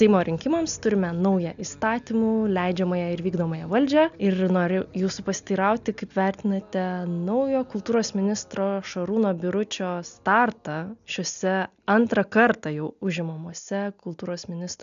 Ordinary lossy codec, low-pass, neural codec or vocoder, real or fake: MP3, 96 kbps; 7.2 kHz; none; real